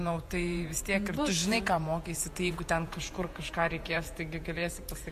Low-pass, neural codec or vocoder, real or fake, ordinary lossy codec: 14.4 kHz; vocoder, 48 kHz, 128 mel bands, Vocos; fake; MP3, 64 kbps